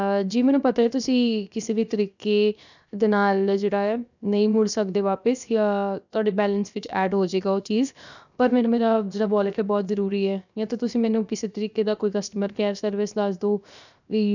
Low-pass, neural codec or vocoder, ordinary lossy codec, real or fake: 7.2 kHz; codec, 16 kHz, 0.7 kbps, FocalCodec; none; fake